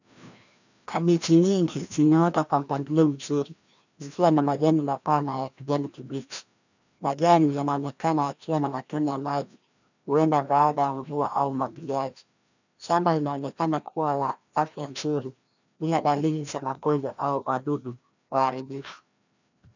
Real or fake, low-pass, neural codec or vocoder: fake; 7.2 kHz; codec, 16 kHz, 1 kbps, FreqCodec, larger model